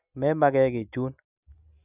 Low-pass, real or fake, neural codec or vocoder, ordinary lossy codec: 3.6 kHz; real; none; none